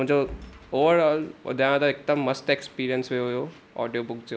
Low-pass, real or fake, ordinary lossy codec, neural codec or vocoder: none; real; none; none